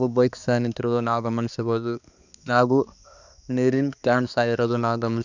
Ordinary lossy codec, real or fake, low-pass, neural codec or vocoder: none; fake; 7.2 kHz; codec, 16 kHz, 2 kbps, X-Codec, HuBERT features, trained on balanced general audio